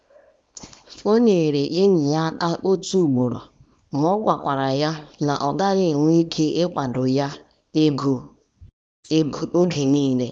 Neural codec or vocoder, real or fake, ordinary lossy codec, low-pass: codec, 24 kHz, 0.9 kbps, WavTokenizer, small release; fake; none; 9.9 kHz